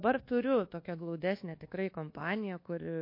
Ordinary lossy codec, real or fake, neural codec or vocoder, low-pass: MP3, 32 kbps; fake; codec, 24 kHz, 1.2 kbps, DualCodec; 5.4 kHz